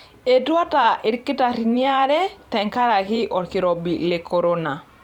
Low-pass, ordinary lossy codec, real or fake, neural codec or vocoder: 19.8 kHz; Opus, 64 kbps; fake; vocoder, 44.1 kHz, 128 mel bands every 512 samples, BigVGAN v2